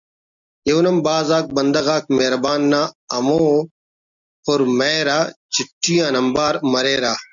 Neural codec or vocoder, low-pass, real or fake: none; 7.2 kHz; real